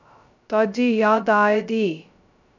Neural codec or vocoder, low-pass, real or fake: codec, 16 kHz, 0.2 kbps, FocalCodec; 7.2 kHz; fake